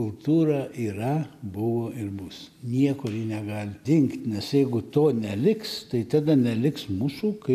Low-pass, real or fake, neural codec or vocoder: 14.4 kHz; real; none